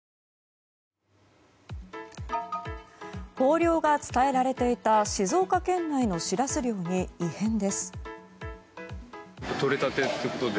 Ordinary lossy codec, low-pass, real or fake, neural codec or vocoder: none; none; real; none